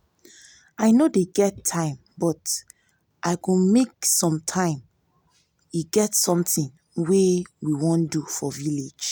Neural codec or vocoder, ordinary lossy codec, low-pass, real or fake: none; none; none; real